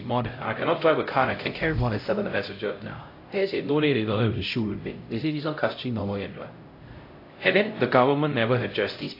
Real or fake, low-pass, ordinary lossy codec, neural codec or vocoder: fake; 5.4 kHz; AAC, 32 kbps; codec, 16 kHz, 0.5 kbps, X-Codec, HuBERT features, trained on LibriSpeech